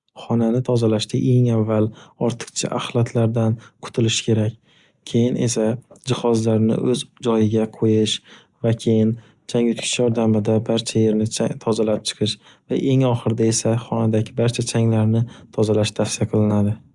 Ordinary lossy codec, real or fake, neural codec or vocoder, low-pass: Opus, 64 kbps; real; none; 10.8 kHz